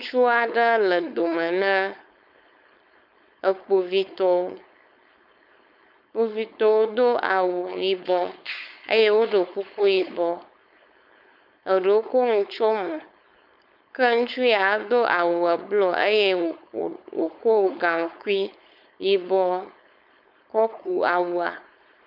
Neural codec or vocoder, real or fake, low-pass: codec, 16 kHz, 4.8 kbps, FACodec; fake; 5.4 kHz